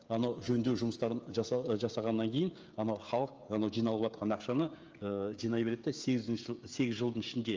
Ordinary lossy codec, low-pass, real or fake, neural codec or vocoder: Opus, 24 kbps; 7.2 kHz; fake; codec, 16 kHz, 16 kbps, FreqCodec, smaller model